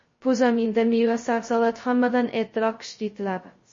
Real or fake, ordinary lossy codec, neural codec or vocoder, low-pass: fake; MP3, 32 kbps; codec, 16 kHz, 0.2 kbps, FocalCodec; 7.2 kHz